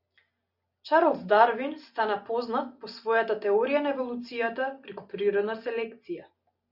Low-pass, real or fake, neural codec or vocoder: 5.4 kHz; real; none